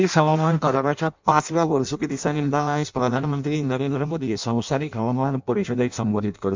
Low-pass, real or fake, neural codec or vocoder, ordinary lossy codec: 7.2 kHz; fake; codec, 16 kHz in and 24 kHz out, 0.6 kbps, FireRedTTS-2 codec; MP3, 64 kbps